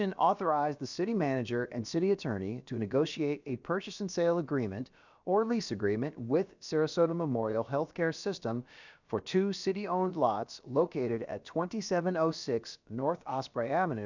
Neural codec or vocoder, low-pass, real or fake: codec, 16 kHz, about 1 kbps, DyCAST, with the encoder's durations; 7.2 kHz; fake